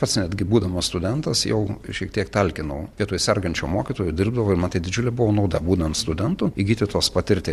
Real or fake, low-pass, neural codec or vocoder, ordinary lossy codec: real; 14.4 kHz; none; Opus, 64 kbps